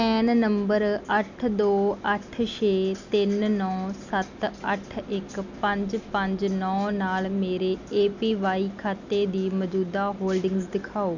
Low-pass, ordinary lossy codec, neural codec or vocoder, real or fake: 7.2 kHz; none; none; real